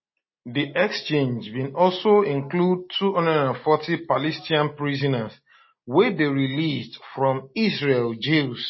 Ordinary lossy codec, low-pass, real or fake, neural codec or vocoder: MP3, 24 kbps; 7.2 kHz; real; none